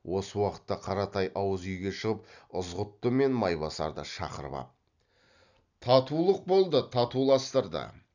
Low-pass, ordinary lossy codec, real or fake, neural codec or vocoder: 7.2 kHz; none; real; none